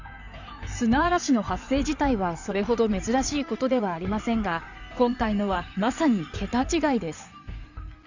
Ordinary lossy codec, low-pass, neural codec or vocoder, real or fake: none; 7.2 kHz; codec, 16 kHz in and 24 kHz out, 2.2 kbps, FireRedTTS-2 codec; fake